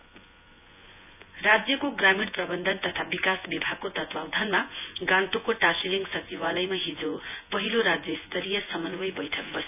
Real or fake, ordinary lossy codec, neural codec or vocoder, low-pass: fake; none; vocoder, 24 kHz, 100 mel bands, Vocos; 3.6 kHz